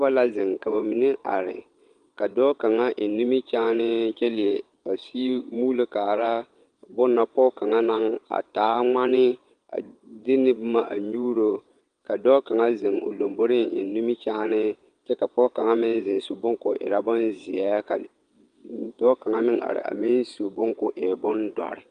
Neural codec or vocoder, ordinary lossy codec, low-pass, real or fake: vocoder, 22.05 kHz, 80 mel bands, WaveNeXt; Opus, 32 kbps; 9.9 kHz; fake